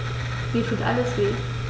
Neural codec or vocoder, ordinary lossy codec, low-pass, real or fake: none; none; none; real